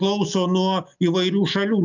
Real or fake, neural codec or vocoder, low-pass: real; none; 7.2 kHz